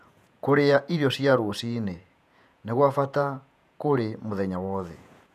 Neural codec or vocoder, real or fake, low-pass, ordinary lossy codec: vocoder, 48 kHz, 128 mel bands, Vocos; fake; 14.4 kHz; none